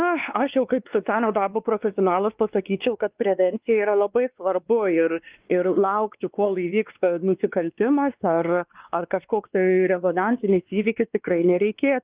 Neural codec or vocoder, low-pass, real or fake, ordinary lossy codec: codec, 16 kHz, 2 kbps, X-Codec, WavLM features, trained on Multilingual LibriSpeech; 3.6 kHz; fake; Opus, 32 kbps